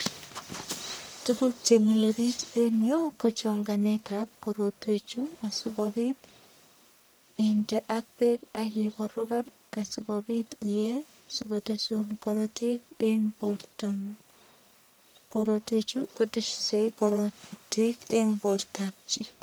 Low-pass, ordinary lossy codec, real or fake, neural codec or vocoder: none; none; fake; codec, 44.1 kHz, 1.7 kbps, Pupu-Codec